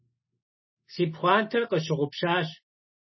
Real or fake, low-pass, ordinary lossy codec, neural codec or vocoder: real; 7.2 kHz; MP3, 24 kbps; none